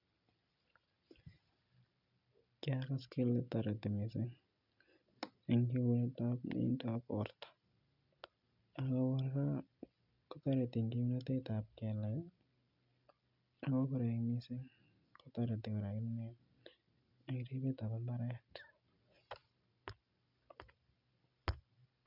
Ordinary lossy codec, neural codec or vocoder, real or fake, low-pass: none; none; real; 5.4 kHz